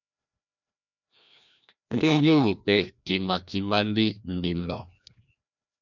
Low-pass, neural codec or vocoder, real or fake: 7.2 kHz; codec, 16 kHz, 1 kbps, FreqCodec, larger model; fake